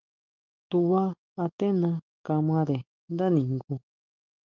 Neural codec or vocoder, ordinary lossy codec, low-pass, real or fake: none; Opus, 24 kbps; 7.2 kHz; real